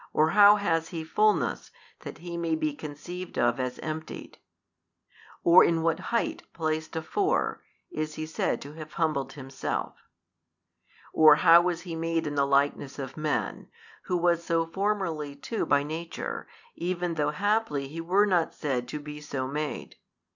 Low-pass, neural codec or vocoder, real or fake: 7.2 kHz; none; real